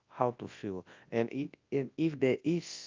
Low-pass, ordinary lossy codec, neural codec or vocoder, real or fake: 7.2 kHz; Opus, 24 kbps; codec, 24 kHz, 0.9 kbps, WavTokenizer, large speech release; fake